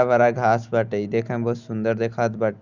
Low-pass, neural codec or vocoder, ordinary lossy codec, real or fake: 7.2 kHz; none; Opus, 64 kbps; real